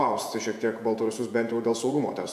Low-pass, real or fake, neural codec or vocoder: 14.4 kHz; fake; vocoder, 48 kHz, 128 mel bands, Vocos